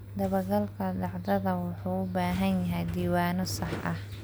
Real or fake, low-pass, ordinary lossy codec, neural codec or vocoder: real; none; none; none